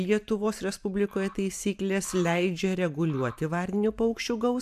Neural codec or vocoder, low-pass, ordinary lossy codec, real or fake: vocoder, 44.1 kHz, 128 mel bands every 512 samples, BigVGAN v2; 14.4 kHz; AAC, 96 kbps; fake